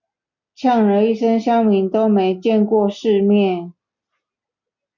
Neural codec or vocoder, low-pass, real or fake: none; 7.2 kHz; real